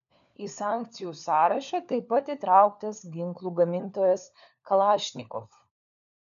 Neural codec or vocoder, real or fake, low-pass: codec, 16 kHz, 4 kbps, FunCodec, trained on LibriTTS, 50 frames a second; fake; 7.2 kHz